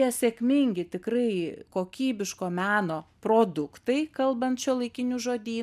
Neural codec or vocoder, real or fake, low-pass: none; real; 14.4 kHz